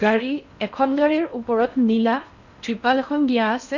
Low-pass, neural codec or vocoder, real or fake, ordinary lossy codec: 7.2 kHz; codec, 16 kHz in and 24 kHz out, 0.6 kbps, FocalCodec, streaming, 4096 codes; fake; none